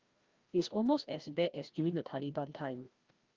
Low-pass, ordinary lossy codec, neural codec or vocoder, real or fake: 7.2 kHz; Opus, 32 kbps; codec, 16 kHz, 1 kbps, FreqCodec, larger model; fake